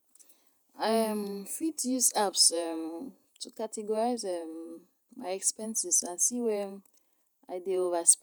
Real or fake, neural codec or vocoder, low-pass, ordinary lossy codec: fake; vocoder, 48 kHz, 128 mel bands, Vocos; none; none